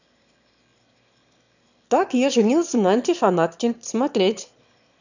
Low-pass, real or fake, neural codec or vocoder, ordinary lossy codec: 7.2 kHz; fake; autoencoder, 22.05 kHz, a latent of 192 numbers a frame, VITS, trained on one speaker; none